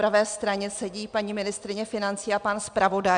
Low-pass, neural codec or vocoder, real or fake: 9.9 kHz; none; real